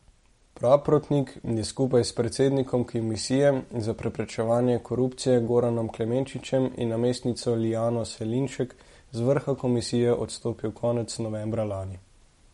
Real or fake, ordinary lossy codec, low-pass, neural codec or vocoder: real; MP3, 48 kbps; 19.8 kHz; none